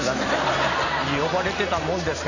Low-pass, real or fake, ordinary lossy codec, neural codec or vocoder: 7.2 kHz; real; none; none